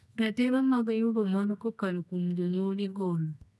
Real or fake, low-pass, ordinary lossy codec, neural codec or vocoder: fake; none; none; codec, 24 kHz, 0.9 kbps, WavTokenizer, medium music audio release